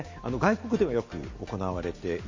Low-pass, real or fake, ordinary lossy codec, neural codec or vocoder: 7.2 kHz; real; MP3, 32 kbps; none